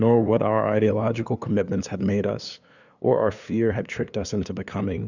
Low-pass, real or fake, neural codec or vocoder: 7.2 kHz; fake; codec, 16 kHz, 2 kbps, FunCodec, trained on LibriTTS, 25 frames a second